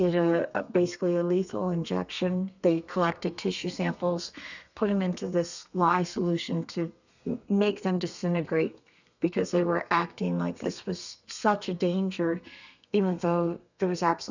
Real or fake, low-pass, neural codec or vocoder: fake; 7.2 kHz; codec, 32 kHz, 1.9 kbps, SNAC